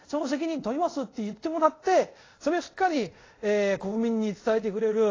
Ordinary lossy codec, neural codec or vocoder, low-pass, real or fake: AAC, 32 kbps; codec, 24 kHz, 0.5 kbps, DualCodec; 7.2 kHz; fake